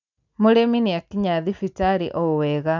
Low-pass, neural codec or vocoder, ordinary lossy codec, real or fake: 7.2 kHz; none; none; real